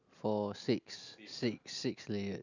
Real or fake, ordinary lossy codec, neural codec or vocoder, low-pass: real; none; none; 7.2 kHz